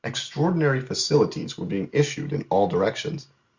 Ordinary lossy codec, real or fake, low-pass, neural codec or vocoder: Opus, 64 kbps; real; 7.2 kHz; none